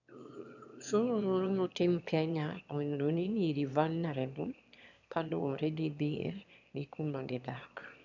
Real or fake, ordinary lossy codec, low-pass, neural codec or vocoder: fake; none; 7.2 kHz; autoencoder, 22.05 kHz, a latent of 192 numbers a frame, VITS, trained on one speaker